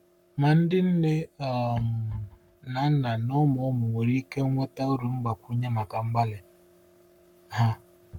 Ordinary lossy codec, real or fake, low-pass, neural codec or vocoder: none; fake; 19.8 kHz; codec, 44.1 kHz, 7.8 kbps, Pupu-Codec